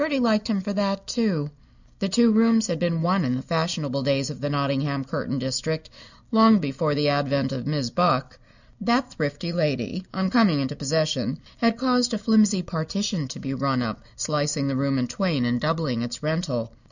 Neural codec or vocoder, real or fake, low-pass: none; real; 7.2 kHz